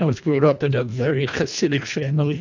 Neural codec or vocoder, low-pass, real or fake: codec, 24 kHz, 1.5 kbps, HILCodec; 7.2 kHz; fake